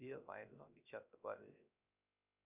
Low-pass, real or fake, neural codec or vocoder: 3.6 kHz; fake; codec, 16 kHz, 0.3 kbps, FocalCodec